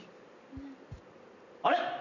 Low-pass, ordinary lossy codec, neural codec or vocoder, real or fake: 7.2 kHz; none; none; real